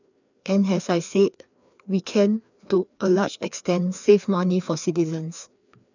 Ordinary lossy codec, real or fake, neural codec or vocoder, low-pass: none; fake; codec, 16 kHz, 2 kbps, FreqCodec, larger model; 7.2 kHz